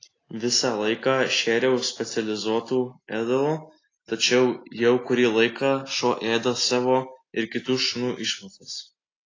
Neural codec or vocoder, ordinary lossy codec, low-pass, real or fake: none; AAC, 32 kbps; 7.2 kHz; real